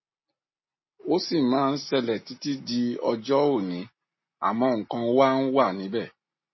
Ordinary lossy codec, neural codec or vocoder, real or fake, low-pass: MP3, 24 kbps; none; real; 7.2 kHz